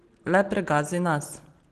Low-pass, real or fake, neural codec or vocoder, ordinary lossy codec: 14.4 kHz; real; none; Opus, 16 kbps